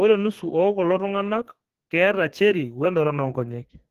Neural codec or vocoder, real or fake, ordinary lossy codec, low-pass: codec, 32 kHz, 1.9 kbps, SNAC; fake; Opus, 16 kbps; 14.4 kHz